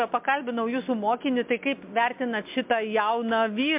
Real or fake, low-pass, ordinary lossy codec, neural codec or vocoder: real; 3.6 kHz; MP3, 32 kbps; none